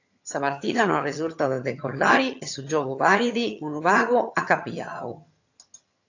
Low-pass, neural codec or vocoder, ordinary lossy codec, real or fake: 7.2 kHz; vocoder, 22.05 kHz, 80 mel bands, HiFi-GAN; AAC, 48 kbps; fake